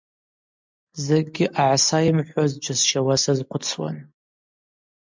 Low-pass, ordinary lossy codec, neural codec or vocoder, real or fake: 7.2 kHz; MP3, 64 kbps; none; real